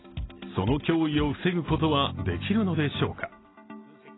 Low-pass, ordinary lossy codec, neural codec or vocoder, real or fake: 7.2 kHz; AAC, 16 kbps; none; real